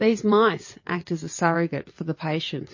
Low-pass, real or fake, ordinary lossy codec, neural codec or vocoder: 7.2 kHz; fake; MP3, 32 kbps; vocoder, 22.05 kHz, 80 mel bands, WaveNeXt